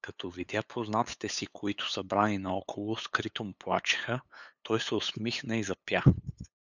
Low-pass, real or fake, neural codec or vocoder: 7.2 kHz; fake; codec, 16 kHz, 8 kbps, FunCodec, trained on LibriTTS, 25 frames a second